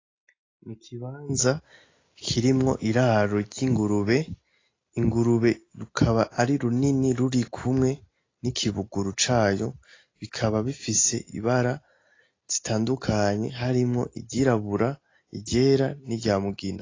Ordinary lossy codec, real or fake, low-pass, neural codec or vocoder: AAC, 32 kbps; real; 7.2 kHz; none